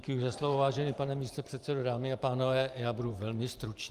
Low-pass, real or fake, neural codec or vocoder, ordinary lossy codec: 14.4 kHz; fake; vocoder, 44.1 kHz, 128 mel bands every 512 samples, BigVGAN v2; Opus, 32 kbps